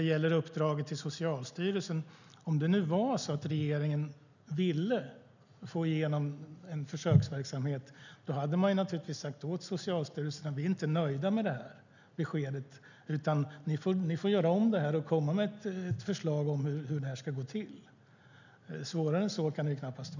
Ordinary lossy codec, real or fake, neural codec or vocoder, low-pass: none; real; none; 7.2 kHz